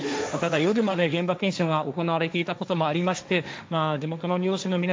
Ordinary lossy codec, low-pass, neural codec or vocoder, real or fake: none; none; codec, 16 kHz, 1.1 kbps, Voila-Tokenizer; fake